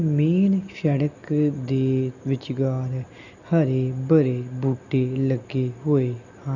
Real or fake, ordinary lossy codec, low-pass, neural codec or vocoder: real; none; 7.2 kHz; none